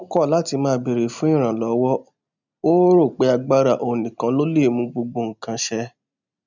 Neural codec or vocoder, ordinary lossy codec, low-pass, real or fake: none; none; 7.2 kHz; real